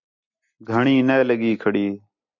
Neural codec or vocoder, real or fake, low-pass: none; real; 7.2 kHz